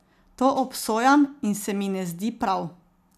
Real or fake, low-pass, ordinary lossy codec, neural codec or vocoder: real; 14.4 kHz; none; none